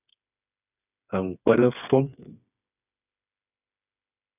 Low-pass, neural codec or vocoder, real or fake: 3.6 kHz; codec, 16 kHz, 4 kbps, FreqCodec, smaller model; fake